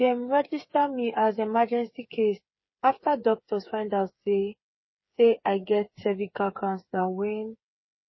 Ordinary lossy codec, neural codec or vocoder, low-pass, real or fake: MP3, 24 kbps; codec, 16 kHz, 8 kbps, FreqCodec, smaller model; 7.2 kHz; fake